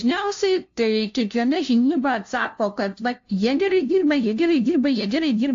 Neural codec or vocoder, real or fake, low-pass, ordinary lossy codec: codec, 16 kHz, 0.5 kbps, FunCodec, trained on LibriTTS, 25 frames a second; fake; 7.2 kHz; AAC, 48 kbps